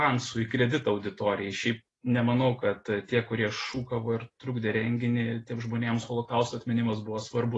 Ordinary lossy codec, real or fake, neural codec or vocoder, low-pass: AAC, 32 kbps; real; none; 10.8 kHz